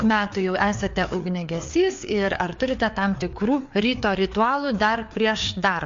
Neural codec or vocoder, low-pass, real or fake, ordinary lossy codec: codec, 16 kHz, 4 kbps, FreqCodec, larger model; 7.2 kHz; fake; MP3, 48 kbps